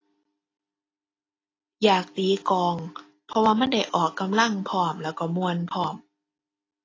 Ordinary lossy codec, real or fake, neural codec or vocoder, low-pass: AAC, 32 kbps; real; none; 7.2 kHz